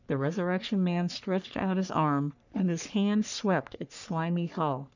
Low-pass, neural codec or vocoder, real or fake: 7.2 kHz; codec, 44.1 kHz, 3.4 kbps, Pupu-Codec; fake